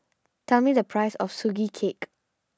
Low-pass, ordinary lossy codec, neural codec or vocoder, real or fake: none; none; none; real